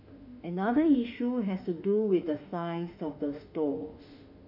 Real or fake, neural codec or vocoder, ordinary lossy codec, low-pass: fake; autoencoder, 48 kHz, 32 numbers a frame, DAC-VAE, trained on Japanese speech; none; 5.4 kHz